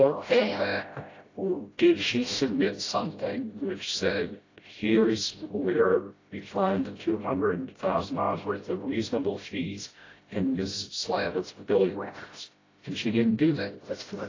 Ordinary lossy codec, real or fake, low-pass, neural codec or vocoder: AAC, 32 kbps; fake; 7.2 kHz; codec, 16 kHz, 0.5 kbps, FreqCodec, smaller model